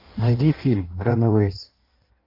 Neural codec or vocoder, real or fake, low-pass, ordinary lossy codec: codec, 16 kHz in and 24 kHz out, 1.1 kbps, FireRedTTS-2 codec; fake; 5.4 kHz; MP3, 48 kbps